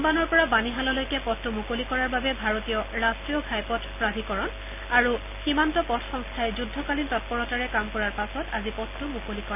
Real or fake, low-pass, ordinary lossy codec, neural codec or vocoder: real; 3.6 kHz; none; none